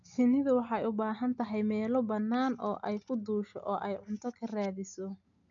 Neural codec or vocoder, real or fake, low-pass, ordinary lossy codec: none; real; 7.2 kHz; none